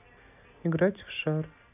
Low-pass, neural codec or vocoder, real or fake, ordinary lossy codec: 3.6 kHz; none; real; none